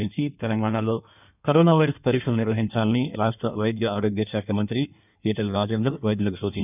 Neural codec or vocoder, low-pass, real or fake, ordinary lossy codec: codec, 16 kHz in and 24 kHz out, 1.1 kbps, FireRedTTS-2 codec; 3.6 kHz; fake; none